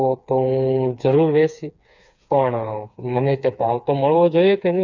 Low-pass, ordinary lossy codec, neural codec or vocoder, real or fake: 7.2 kHz; none; codec, 16 kHz, 4 kbps, FreqCodec, smaller model; fake